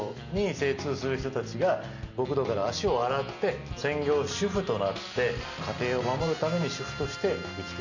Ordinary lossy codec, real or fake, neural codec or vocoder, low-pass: none; real; none; 7.2 kHz